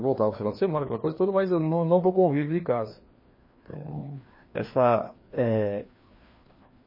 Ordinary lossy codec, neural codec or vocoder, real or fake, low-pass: MP3, 24 kbps; codec, 16 kHz, 2 kbps, FreqCodec, larger model; fake; 5.4 kHz